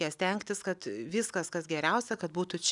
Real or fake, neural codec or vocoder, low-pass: real; none; 10.8 kHz